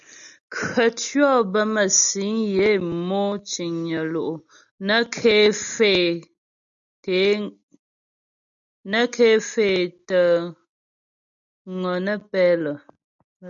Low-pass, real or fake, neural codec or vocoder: 7.2 kHz; real; none